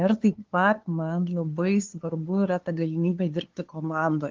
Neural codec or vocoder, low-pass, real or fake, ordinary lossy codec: codec, 16 kHz, 2 kbps, FunCodec, trained on LibriTTS, 25 frames a second; 7.2 kHz; fake; Opus, 16 kbps